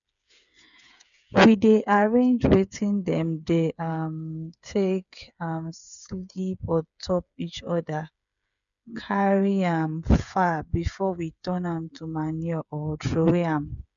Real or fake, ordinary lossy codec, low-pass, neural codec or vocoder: fake; none; 7.2 kHz; codec, 16 kHz, 8 kbps, FreqCodec, smaller model